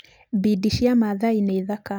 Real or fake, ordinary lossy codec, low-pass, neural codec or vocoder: real; none; none; none